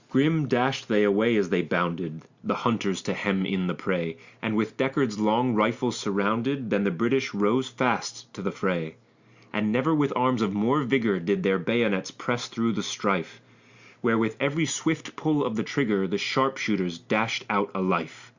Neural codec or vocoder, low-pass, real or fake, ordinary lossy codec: none; 7.2 kHz; real; Opus, 64 kbps